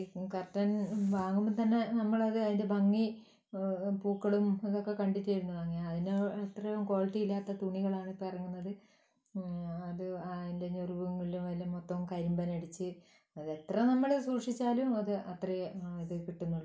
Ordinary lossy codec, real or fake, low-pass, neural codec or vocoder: none; real; none; none